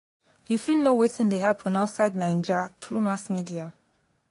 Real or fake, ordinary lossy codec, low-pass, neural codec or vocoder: fake; AAC, 48 kbps; 10.8 kHz; codec, 24 kHz, 1 kbps, SNAC